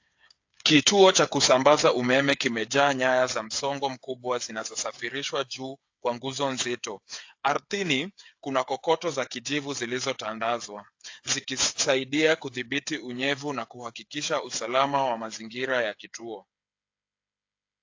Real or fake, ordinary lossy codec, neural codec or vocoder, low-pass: fake; AAC, 48 kbps; codec, 16 kHz, 8 kbps, FreqCodec, smaller model; 7.2 kHz